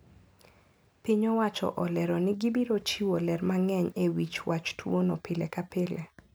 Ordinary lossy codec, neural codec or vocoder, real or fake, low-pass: none; none; real; none